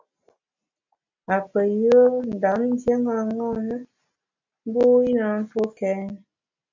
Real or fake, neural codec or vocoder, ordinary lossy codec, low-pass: real; none; MP3, 64 kbps; 7.2 kHz